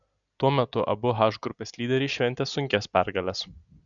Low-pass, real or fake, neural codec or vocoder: 7.2 kHz; real; none